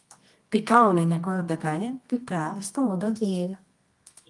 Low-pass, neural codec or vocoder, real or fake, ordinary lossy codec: 10.8 kHz; codec, 24 kHz, 0.9 kbps, WavTokenizer, medium music audio release; fake; Opus, 32 kbps